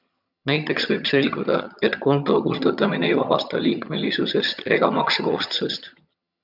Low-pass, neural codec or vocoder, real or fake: 5.4 kHz; vocoder, 22.05 kHz, 80 mel bands, HiFi-GAN; fake